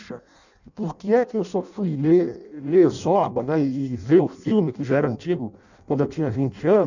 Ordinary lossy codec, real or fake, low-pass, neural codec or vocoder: none; fake; 7.2 kHz; codec, 16 kHz in and 24 kHz out, 0.6 kbps, FireRedTTS-2 codec